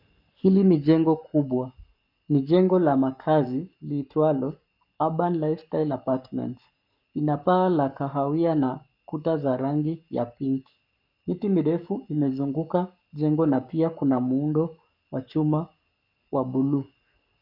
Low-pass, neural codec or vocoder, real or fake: 5.4 kHz; codec, 44.1 kHz, 7.8 kbps, Pupu-Codec; fake